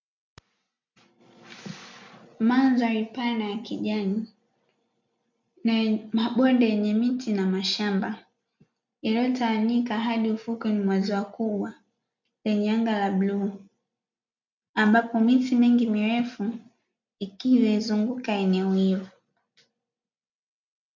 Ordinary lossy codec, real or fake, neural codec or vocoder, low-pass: MP3, 64 kbps; real; none; 7.2 kHz